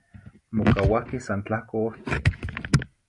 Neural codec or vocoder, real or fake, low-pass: none; real; 10.8 kHz